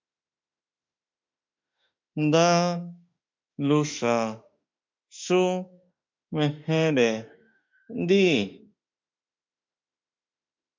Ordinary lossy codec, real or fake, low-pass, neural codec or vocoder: MP3, 64 kbps; fake; 7.2 kHz; autoencoder, 48 kHz, 32 numbers a frame, DAC-VAE, trained on Japanese speech